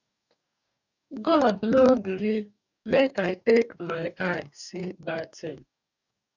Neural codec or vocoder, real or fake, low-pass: codec, 44.1 kHz, 2.6 kbps, DAC; fake; 7.2 kHz